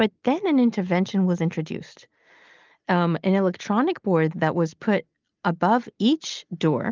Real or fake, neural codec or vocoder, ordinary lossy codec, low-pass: real; none; Opus, 24 kbps; 7.2 kHz